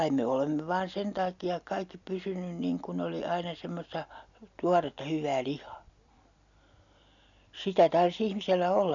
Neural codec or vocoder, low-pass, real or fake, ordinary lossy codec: none; 7.2 kHz; real; none